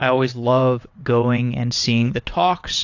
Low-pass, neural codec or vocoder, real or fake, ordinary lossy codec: 7.2 kHz; vocoder, 22.05 kHz, 80 mel bands, WaveNeXt; fake; AAC, 48 kbps